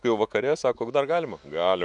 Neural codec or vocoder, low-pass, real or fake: autoencoder, 48 kHz, 128 numbers a frame, DAC-VAE, trained on Japanese speech; 10.8 kHz; fake